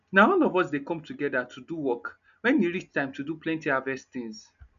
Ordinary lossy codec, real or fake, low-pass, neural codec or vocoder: none; real; 7.2 kHz; none